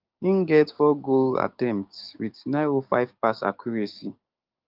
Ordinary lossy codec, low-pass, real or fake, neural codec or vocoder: Opus, 32 kbps; 5.4 kHz; fake; codec, 16 kHz, 6 kbps, DAC